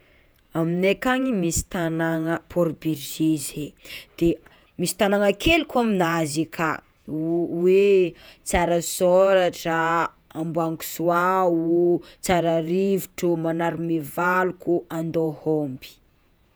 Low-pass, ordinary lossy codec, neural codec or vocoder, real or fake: none; none; vocoder, 48 kHz, 128 mel bands, Vocos; fake